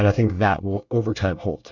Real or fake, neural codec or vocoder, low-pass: fake; codec, 24 kHz, 1 kbps, SNAC; 7.2 kHz